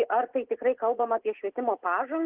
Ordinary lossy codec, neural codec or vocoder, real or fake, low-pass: Opus, 16 kbps; none; real; 3.6 kHz